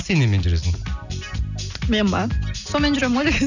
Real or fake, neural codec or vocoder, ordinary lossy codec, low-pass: real; none; none; 7.2 kHz